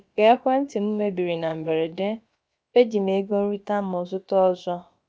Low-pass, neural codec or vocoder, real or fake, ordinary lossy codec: none; codec, 16 kHz, about 1 kbps, DyCAST, with the encoder's durations; fake; none